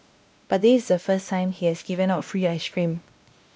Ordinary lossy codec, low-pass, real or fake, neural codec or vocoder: none; none; fake; codec, 16 kHz, 1 kbps, X-Codec, WavLM features, trained on Multilingual LibriSpeech